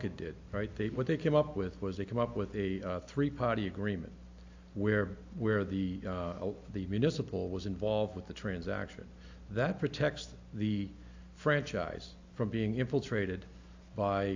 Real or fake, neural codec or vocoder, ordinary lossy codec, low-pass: real; none; AAC, 48 kbps; 7.2 kHz